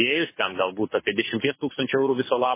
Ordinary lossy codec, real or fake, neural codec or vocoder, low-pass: MP3, 16 kbps; fake; codec, 24 kHz, 6 kbps, HILCodec; 3.6 kHz